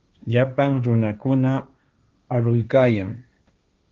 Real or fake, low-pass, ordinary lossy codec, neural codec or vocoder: fake; 7.2 kHz; Opus, 24 kbps; codec, 16 kHz, 1.1 kbps, Voila-Tokenizer